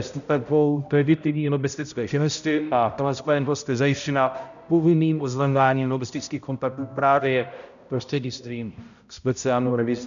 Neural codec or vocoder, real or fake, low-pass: codec, 16 kHz, 0.5 kbps, X-Codec, HuBERT features, trained on balanced general audio; fake; 7.2 kHz